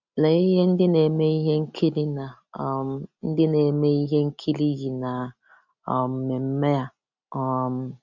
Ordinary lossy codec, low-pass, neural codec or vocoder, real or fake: none; 7.2 kHz; none; real